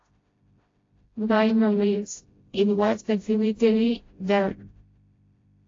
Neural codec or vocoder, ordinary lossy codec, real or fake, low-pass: codec, 16 kHz, 0.5 kbps, FreqCodec, smaller model; AAC, 32 kbps; fake; 7.2 kHz